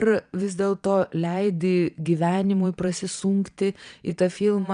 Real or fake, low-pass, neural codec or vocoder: fake; 9.9 kHz; vocoder, 22.05 kHz, 80 mel bands, Vocos